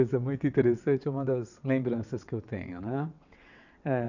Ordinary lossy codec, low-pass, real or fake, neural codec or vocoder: none; 7.2 kHz; fake; vocoder, 22.05 kHz, 80 mel bands, WaveNeXt